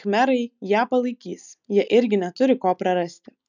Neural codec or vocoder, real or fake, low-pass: none; real; 7.2 kHz